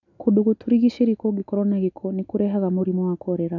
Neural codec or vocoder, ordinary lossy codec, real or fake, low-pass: none; none; real; 7.2 kHz